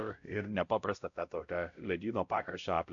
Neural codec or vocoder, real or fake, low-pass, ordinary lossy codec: codec, 16 kHz, 0.5 kbps, X-Codec, WavLM features, trained on Multilingual LibriSpeech; fake; 7.2 kHz; AAC, 64 kbps